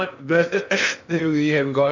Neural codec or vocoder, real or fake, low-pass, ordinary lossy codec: codec, 16 kHz in and 24 kHz out, 0.8 kbps, FocalCodec, streaming, 65536 codes; fake; 7.2 kHz; none